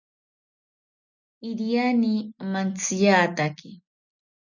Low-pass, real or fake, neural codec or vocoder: 7.2 kHz; real; none